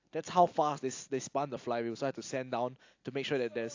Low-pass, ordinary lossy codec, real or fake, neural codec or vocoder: 7.2 kHz; AAC, 48 kbps; real; none